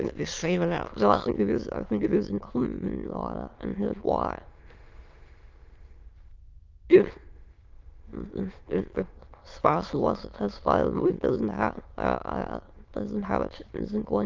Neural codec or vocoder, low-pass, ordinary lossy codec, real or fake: autoencoder, 22.05 kHz, a latent of 192 numbers a frame, VITS, trained on many speakers; 7.2 kHz; Opus, 32 kbps; fake